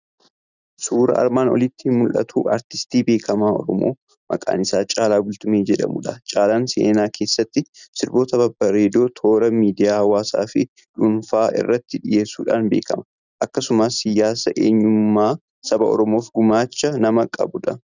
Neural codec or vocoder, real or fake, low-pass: none; real; 7.2 kHz